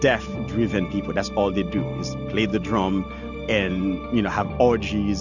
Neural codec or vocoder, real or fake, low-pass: none; real; 7.2 kHz